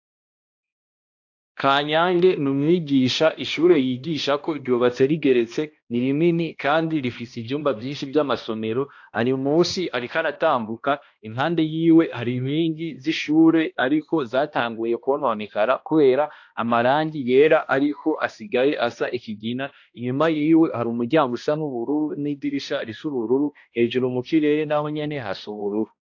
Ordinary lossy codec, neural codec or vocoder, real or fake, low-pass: AAC, 48 kbps; codec, 16 kHz, 1 kbps, X-Codec, HuBERT features, trained on balanced general audio; fake; 7.2 kHz